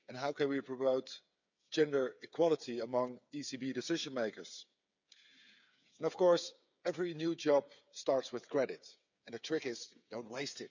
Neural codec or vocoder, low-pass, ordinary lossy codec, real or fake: codec, 16 kHz, 8 kbps, FreqCodec, smaller model; 7.2 kHz; none; fake